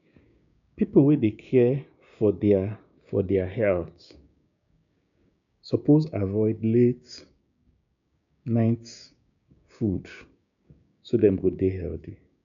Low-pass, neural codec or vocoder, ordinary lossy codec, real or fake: 7.2 kHz; codec, 16 kHz, 6 kbps, DAC; none; fake